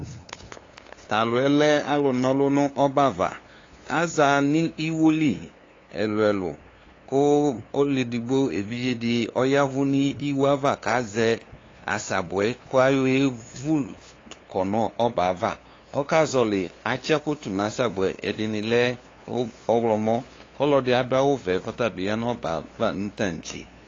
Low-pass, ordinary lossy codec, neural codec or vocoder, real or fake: 7.2 kHz; AAC, 32 kbps; codec, 16 kHz, 2 kbps, FunCodec, trained on LibriTTS, 25 frames a second; fake